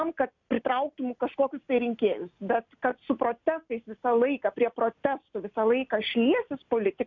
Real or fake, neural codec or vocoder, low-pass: real; none; 7.2 kHz